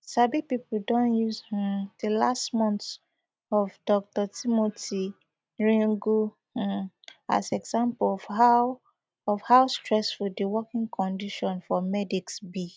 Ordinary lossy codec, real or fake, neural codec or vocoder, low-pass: none; real; none; none